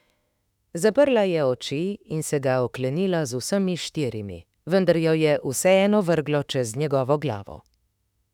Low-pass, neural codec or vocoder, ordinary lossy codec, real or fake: 19.8 kHz; autoencoder, 48 kHz, 32 numbers a frame, DAC-VAE, trained on Japanese speech; none; fake